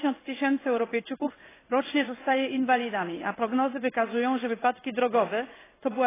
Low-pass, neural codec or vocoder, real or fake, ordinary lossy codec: 3.6 kHz; codec, 16 kHz in and 24 kHz out, 1 kbps, XY-Tokenizer; fake; AAC, 16 kbps